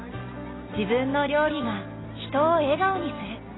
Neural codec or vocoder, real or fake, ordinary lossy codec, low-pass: none; real; AAC, 16 kbps; 7.2 kHz